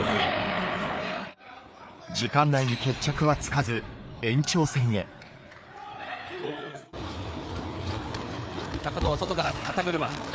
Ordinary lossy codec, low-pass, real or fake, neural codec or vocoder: none; none; fake; codec, 16 kHz, 4 kbps, FreqCodec, larger model